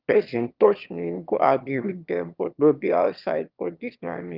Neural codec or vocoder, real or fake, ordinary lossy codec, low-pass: autoencoder, 22.05 kHz, a latent of 192 numbers a frame, VITS, trained on one speaker; fake; Opus, 24 kbps; 5.4 kHz